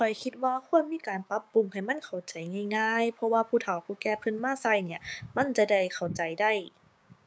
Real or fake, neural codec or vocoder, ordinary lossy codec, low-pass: fake; codec, 16 kHz, 6 kbps, DAC; none; none